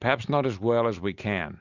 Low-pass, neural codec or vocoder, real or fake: 7.2 kHz; none; real